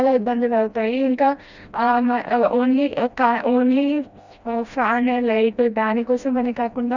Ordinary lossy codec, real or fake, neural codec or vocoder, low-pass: Opus, 64 kbps; fake; codec, 16 kHz, 1 kbps, FreqCodec, smaller model; 7.2 kHz